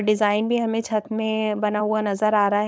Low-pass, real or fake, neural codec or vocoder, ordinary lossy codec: none; fake; codec, 16 kHz, 4.8 kbps, FACodec; none